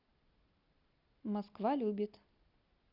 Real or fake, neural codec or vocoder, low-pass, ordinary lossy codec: real; none; 5.4 kHz; Opus, 64 kbps